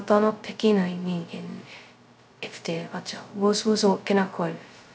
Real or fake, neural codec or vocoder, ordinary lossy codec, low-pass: fake; codec, 16 kHz, 0.2 kbps, FocalCodec; none; none